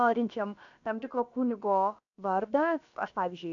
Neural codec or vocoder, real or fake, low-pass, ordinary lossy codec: codec, 16 kHz, about 1 kbps, DyCAST, with the encoder's durations; fake; 7.2 kHz; AAC, 64 kbps